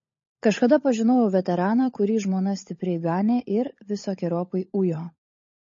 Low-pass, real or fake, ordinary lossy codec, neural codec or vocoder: 7.2 kHz; fake; MP3, 32 kbps; codec, 16 kHz, 16 kbps, FunCodec, trained on LibriTTS, 50 frames a second